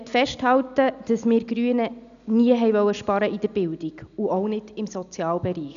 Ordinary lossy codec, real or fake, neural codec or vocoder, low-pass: none; real; none; 7.2 kHz